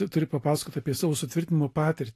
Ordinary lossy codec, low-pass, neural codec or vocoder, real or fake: AAC, 48 kbps; 14.4 kHz; none; real